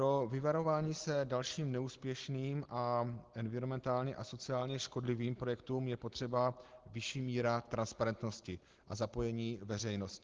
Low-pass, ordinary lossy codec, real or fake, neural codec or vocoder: 7.2 kHz; Opus, 16 kbps; real; none